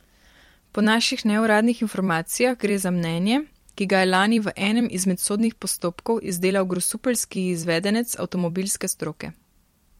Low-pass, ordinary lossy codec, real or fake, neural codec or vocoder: 19.8 kHz; MP3, 64 kbps; fake; vocoder, 44.1 kHz, 128 mel bands every 256 samples, BigVGAN v2